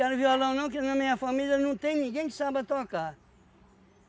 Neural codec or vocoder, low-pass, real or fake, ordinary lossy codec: none; none; real; none